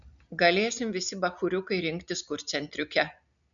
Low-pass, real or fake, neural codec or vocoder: 7.2 kHz; real; none